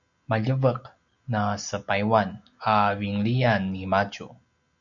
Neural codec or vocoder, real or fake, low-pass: none; real; 7.2 kHz